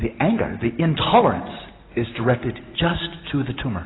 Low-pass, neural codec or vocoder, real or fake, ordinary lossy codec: 7.2 kHz; none; real; AAC, 16 kbps